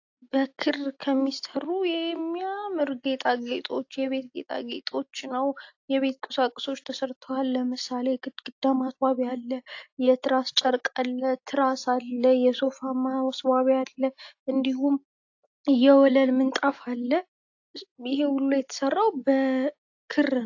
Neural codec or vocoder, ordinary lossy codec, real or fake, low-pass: none; AAC, 48 kbps; real; 7.2 kHz